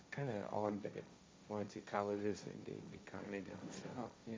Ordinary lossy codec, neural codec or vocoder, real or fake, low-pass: none; codec, 16 kHz, 1.1 kbps, Voila-Tokenizer; fake; none